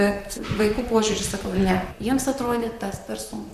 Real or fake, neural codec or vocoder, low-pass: fake; vocoder, 44.1 kHz, 128 mel bands, Pupu-Vocoder; 14.4 kHz